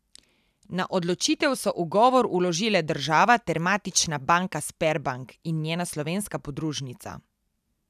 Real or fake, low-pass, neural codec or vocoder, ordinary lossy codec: real; 14.4 kHz; none; none